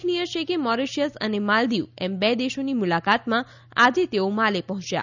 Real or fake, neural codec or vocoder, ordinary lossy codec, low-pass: real; none; none; 7.2 kHz